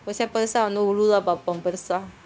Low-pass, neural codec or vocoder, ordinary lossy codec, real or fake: none; codec, 16 kHz, 0.9 kbps, LongCat-Audio-Codec; none; fake